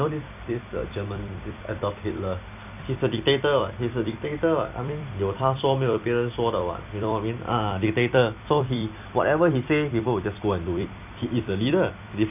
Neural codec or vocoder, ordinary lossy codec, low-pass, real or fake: vocoder, 44.1 kHz, 128 mel bands every 256 samples, BigVGAN v2; none; 3.6 kHz; fake